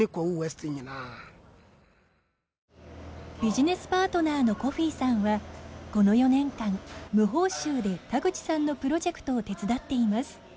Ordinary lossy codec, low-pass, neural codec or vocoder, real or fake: none; none; none; real